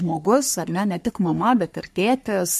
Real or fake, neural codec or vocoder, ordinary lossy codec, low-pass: fake; codec, 44.1 kHz, 3.4 kbps, Pupu-Codec; MP3, 64 kbps; 14.4 kHz